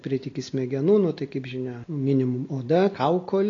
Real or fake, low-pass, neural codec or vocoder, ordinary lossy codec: real; 7.2 kHz; none; AAC, 32 kbps